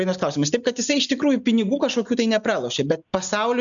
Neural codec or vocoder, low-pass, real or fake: none; 7.2 kHz; real